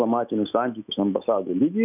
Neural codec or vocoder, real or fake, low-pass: autoencoder, 48 kHz, 128 numbers a frame, DAC-VAE, trained on Japanese speech; fake; 3.6 kHz